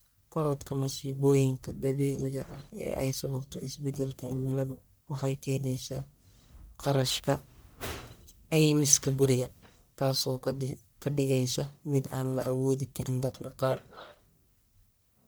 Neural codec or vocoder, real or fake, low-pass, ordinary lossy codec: codec, 44.1 kHz, 1.7 kbps, Pupu-Codec; fake; none; none